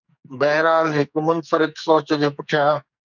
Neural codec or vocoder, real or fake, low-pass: codec, 44.1 kHz, 2.6 kbps, SNAC; fake; 7.2 kHz